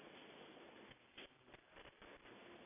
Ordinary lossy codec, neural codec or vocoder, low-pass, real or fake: none; none; 3.6 kHz; real